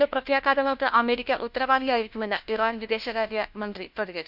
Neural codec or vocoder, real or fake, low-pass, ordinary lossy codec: codec, 16 kHz, 1 kbps, FunCodec, trained on LibriTTS, 50 frames a second; fake; 5.4 kHz; none